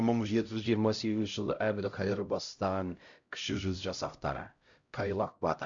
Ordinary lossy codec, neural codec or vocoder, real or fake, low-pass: Opus, 64 kbps; codec, 16 kHz, 0.5 kbps, X-Codec, HuBERT features, trained on LibriSpeech; fake; 7.2 kHz